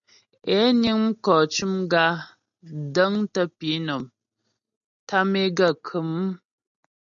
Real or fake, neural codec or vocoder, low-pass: real; none; 7.2 kHz